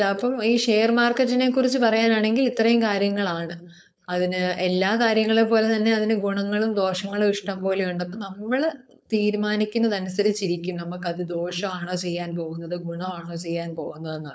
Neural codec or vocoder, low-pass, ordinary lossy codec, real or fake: codec, 16 kHz, 4.8 kbps, FACodec; none; none; fake